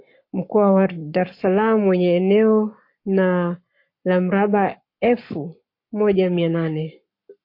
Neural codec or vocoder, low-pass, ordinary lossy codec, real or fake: codec, 44.1 kHz, 7.8 kbps, Pupu-Codec; 5.4 kHz; MP3, 32 kbps; fake